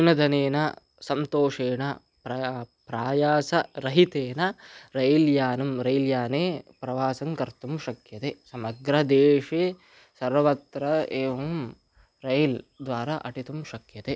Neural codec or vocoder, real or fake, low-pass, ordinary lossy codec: none; real; none; none